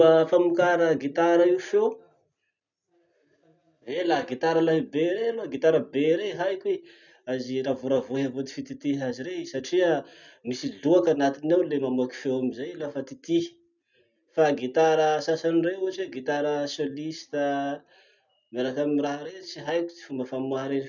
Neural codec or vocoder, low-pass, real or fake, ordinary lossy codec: none; 7.2 kHz; real; none